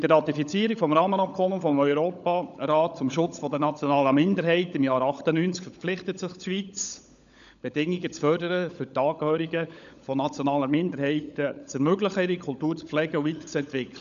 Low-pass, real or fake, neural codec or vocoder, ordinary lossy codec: 7.2 kHz; fake; codec, 16 kHz, 16 kbps, FunCodec, trained on LibriTTS, 50 frames a second; none